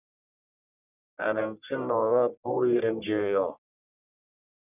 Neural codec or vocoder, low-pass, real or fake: codec, 44.1 kHz, 1.7 kbps, Pupu-Codec; 3.6 kHz; fake